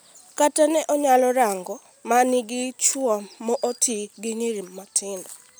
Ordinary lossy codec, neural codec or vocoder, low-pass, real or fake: none; none; none; real